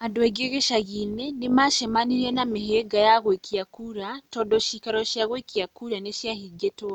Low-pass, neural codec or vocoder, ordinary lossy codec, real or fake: 19.8 kHz; none; none; real